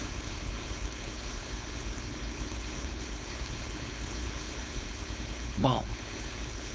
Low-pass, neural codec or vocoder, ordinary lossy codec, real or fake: none; codec, 16 kHz, 4.8 kbps, FACodec; none; fake